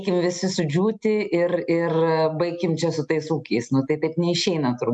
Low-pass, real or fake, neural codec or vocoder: 10.8 kHz; real; none